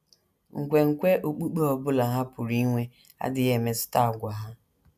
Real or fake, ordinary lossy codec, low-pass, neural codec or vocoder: real; none; 14.4 kHz; none